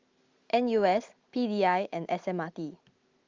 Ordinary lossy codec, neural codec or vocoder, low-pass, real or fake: Opus, 32 kbps; none; 7.2 kHz; real